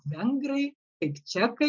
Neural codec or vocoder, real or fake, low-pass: none; real; 7.2 kHz